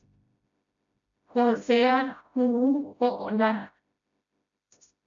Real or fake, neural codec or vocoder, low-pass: fake; codec, 16 kHz, 0.5 kbps, FreqCodec, smaller model; 7.2 kHz